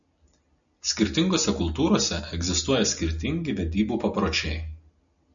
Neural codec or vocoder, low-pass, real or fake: none; 7.2 kHz; real